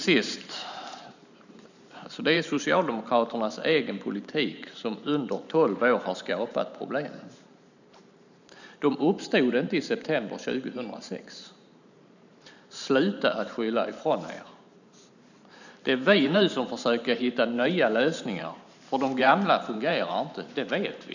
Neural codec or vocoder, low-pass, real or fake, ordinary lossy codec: vocoder, 44.1 kHz, 128 mel bands every 512 samples, BigVGAN v2; 7.2 kHz; fake; none